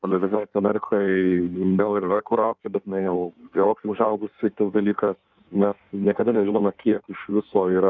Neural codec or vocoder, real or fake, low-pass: codec, 16 kHz in and 24 kHz out, 1.1 kbps, FireRedTTS-2 codec; fake; 7.2 kHz